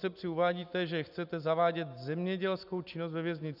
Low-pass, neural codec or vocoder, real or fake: 5.4 kHz; none; real